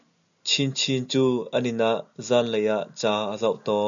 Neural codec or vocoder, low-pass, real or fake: none; 7.2 kHz; real